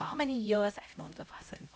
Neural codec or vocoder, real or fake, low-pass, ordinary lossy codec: codec, 16 kHz, 0.5 kbps, X-Codec, HuBERT features, trained on LibriSpeech; fake; none; none